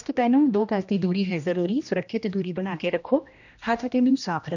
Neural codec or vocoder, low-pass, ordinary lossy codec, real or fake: codec, 16 kHz, 1 kbps, X-Codec, HuBERT features, trained on general audio; 7.2 kHz; none; fake